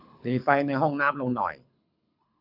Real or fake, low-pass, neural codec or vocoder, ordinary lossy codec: fake; 5.4 kHz; codec, 24 kHz, 6 kbps, HILCodec; AAC, 48 kbps